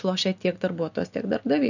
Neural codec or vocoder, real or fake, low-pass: none; real; 7.2 kHz